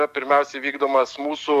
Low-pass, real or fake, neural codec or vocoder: 14.4 kHz; fake; codec, 44.1 kHz, 7.8 kbps, DAC